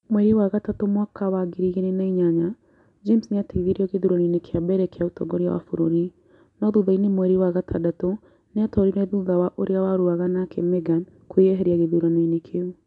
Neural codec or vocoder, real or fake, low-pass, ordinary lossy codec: none; real; 10.8 kHz; none